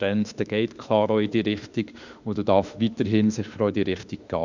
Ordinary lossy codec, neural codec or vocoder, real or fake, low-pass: none; autoencoder, 48 kHz, 32 numbers a frame, DAC-VAE, trained on Japanese speech; fake; 7.2 kHz